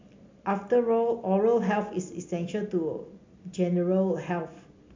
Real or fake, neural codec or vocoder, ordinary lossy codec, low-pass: real; none; MP3, 64 kbps; 7.2 kHz